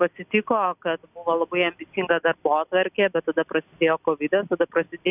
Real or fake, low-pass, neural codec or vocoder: real; 3.6 kHz; none